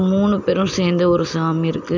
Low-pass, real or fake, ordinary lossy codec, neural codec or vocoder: 7.2 kHz; real; none; none